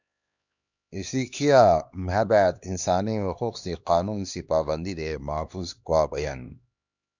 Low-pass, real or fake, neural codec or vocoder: 7.2 kHz; fake; codec, 16 kHz, 2 kbps, X-Codec, HuBERT features, trained on LibriSpeech